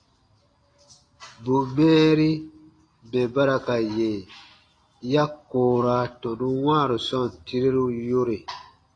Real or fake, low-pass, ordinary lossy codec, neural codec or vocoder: real; 9.9 kHz; AAC, 32 kbps; none